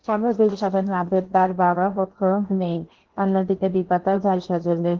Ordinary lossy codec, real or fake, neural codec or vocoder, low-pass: Opus, 16 kbps; fake; codec, 16 kHz in and 24 kHz out, 0.8 kbps, FocalCodec, streaming, 65536 codes; 7.2 kHz